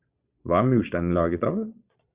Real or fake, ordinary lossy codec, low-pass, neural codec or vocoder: fake; Opus, 64 kbps; 3.6 kHz; vocoder, 22.05 kHz, 80 mel bands, Vocos